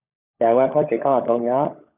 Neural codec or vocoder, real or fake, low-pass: codec, 16 kHz, 16 kbps, FunCodec, trained on LibriTTS, 50 frames a second; fake; 3.6 kHz